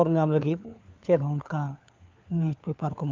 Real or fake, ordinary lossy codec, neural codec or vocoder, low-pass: fake; Opus, 24 kbps; codec, 16 kHz, 4 kbps, FunCodec, trained on LibriTTS, 50 frames a second; 7.2 kHz